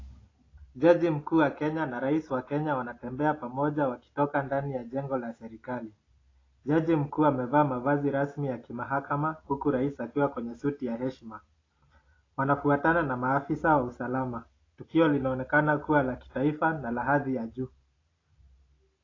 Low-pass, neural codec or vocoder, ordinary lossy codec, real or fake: 7.2 kHz; none; AAC, 32 kbps; real